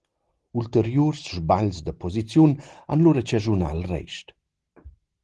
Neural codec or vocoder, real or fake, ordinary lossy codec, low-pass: none; real; Opus, 16 kbps; 10.8 kHz